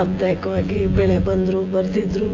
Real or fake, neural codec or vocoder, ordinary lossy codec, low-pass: fake; vocoder, 24 kHz, 100 mel bands, Vocos; AAC, 32 kbps; 7.2 kHz